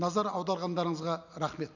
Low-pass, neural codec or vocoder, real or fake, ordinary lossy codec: 7.2 kHz; none; real; none